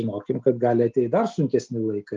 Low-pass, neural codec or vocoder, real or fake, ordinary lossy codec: 7.2 kHz; none; real; Opus, 24 kbps